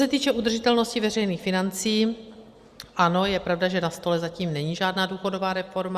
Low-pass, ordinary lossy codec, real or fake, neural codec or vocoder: 14.4 kHz; Opus, 64 kbps; real; none